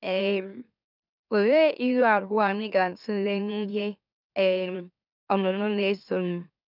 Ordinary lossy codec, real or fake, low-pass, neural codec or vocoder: none; fake; 5.4 kHz; autoencoder, 44.1 kHz, a latent of 192 numbers a frame, MeloTTS